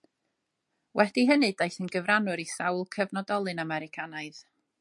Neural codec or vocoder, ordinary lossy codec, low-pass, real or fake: none; MP3, 64 kbps; 10.8 kHz; real